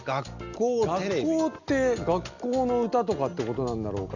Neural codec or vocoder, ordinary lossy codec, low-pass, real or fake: none; Opus, 64 kbps; 7.2 kHz; real